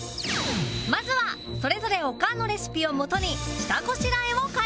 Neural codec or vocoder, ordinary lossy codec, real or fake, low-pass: none; none; real; none